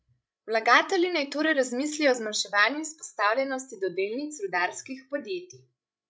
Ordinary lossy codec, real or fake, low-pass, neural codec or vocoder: none; fake; none; codec, 16 kHz, 16 kbps, FreqCodec, larger model